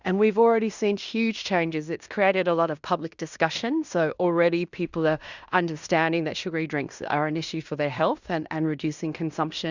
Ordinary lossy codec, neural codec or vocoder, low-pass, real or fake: Opus, 64 kbps; codec, 16 kHz in and 24 kHz out, 0.9 kbps, LongCat-Audio-Codec, fine tuned four codebook decoder; 7.2 kHz; fake